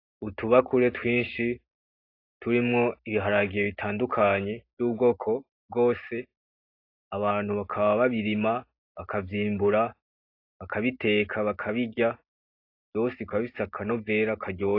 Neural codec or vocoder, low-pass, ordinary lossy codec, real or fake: none; 5.4 kHz; AAC, 32 kbps; real